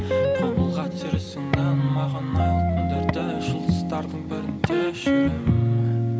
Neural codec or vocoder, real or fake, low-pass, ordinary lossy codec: none; real; none; none